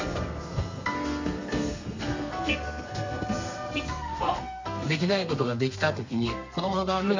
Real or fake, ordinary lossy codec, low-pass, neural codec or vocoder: fake; MP3, 64 kbps; 7.2 kHz; codec, 32 kHz, 1.9 kbps, SNAC